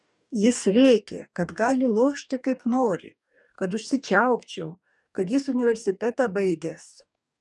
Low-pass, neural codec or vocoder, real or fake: 10.8 kHz; codec, 44.1 kHz, 2.6 kbps, DAC; fake